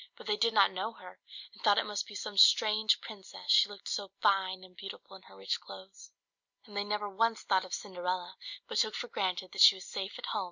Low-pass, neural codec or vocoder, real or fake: 7.2 kHz; none; real